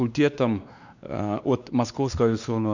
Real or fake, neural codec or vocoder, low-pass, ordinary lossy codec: fake; codec, 16 kHz, 2 kbps, X-Codec, WavLM features, trained on Multilingual LibriSpeech; 7.2 kHz; none